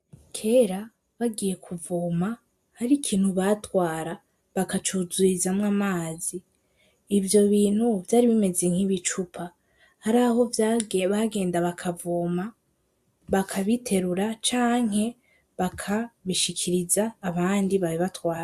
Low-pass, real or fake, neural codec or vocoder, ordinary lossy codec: 14.4 kHz; real; none; AAC, 96 kbps